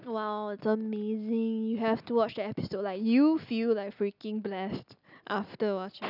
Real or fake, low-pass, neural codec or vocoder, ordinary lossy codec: real; 5.4 kHz; none; none